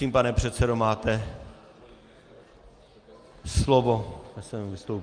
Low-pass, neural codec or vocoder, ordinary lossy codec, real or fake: 9.9 kHz; none; Opus, 32 kbps; real